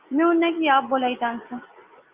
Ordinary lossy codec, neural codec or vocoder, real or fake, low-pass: Opus, 24 kbps; none; real; 3.6 kHz